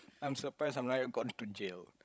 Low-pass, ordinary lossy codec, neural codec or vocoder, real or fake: none; none; codec, 16 kHz, 16 kbps, FreqCodec, larger model; fake